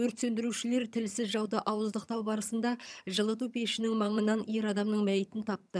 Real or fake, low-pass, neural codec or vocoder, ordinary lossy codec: fake; none; vocoder, 22.05 kHz, 80 mel bands, HiFi-GAN; none